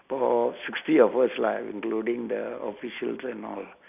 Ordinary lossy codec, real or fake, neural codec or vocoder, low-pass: none; real; none; 3.6 kHz